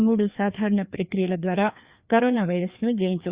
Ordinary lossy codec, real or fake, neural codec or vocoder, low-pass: Opus, 64 kbps; fake; codec, 16 kHz, 2 kbps, FreqCodec, larger model; 3.6 kHz